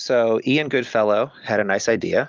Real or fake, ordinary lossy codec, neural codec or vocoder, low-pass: real; Opus, 24 kbps; none; 7.2 kHz